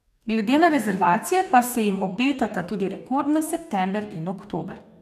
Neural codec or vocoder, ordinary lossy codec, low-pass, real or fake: codec, 44.1 kHz, 2.6 kbps, DAC; none; 14.4 kHz; fake